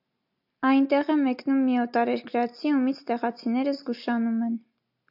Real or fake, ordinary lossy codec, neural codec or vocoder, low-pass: real; AAC, 48 kbps; none; 5.4 kHz